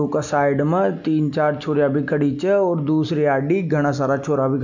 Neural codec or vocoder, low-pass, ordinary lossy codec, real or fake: none; 7.2 kHz; none; real